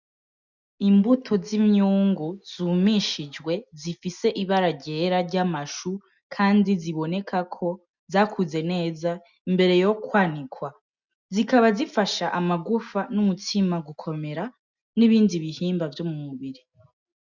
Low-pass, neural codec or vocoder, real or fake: 7.2 kHz; none; real